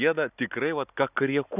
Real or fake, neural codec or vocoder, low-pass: real; none; 3.6 kHz